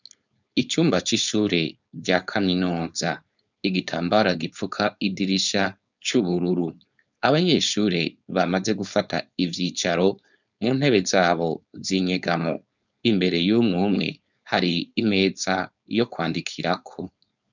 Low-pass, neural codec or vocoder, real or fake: 7.2 kHz; codec, 16 kHz, 4.8 kbps, FACodec; fake